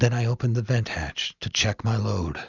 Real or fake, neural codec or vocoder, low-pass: real; none; 7.2 kHz